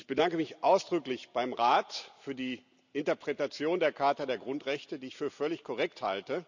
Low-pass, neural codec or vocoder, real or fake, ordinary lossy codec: 7.2 kHz; none; real; none